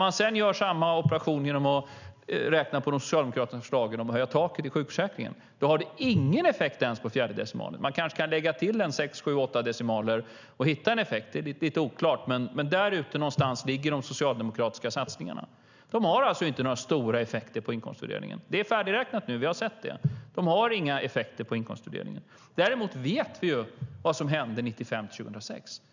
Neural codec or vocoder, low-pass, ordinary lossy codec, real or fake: none; 7.2 kHz; none; real